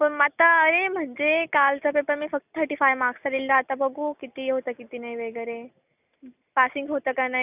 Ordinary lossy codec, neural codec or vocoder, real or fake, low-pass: none; none; real; 3.6 kHz